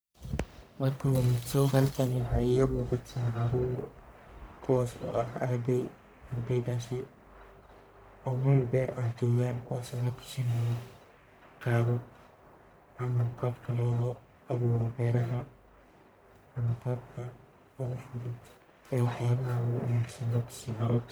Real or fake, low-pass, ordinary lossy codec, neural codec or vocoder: fake; none; none; codec, 44.1 kHz, 1.7 kbps, Pupu-Codec